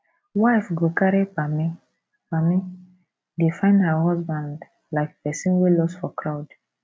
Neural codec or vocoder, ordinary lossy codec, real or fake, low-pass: none; none; real; none